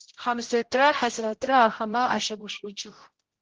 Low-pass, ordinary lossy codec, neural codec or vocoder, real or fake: 7.2 kHz; Opus, 16 kbps; codec, 16 kHz, 0.5 kbps, X-Codec, HuBERT features, trained on general audio; fake